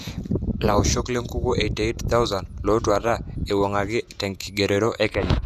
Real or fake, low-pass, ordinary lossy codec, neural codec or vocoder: fake; 14.4 kHz; none; vocoder, 48 kHz, 128 mel bands, Vocos